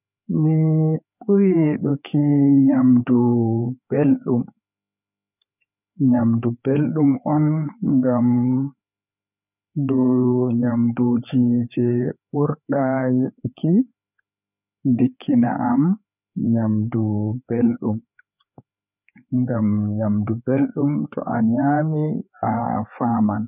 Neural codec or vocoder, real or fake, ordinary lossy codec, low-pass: codec, 16 kHz, 4 kbps, FreqCodec, larger model; fake; none; 3.6 kHz